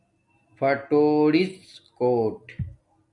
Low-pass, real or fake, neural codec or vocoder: 10.8 kHz; real; none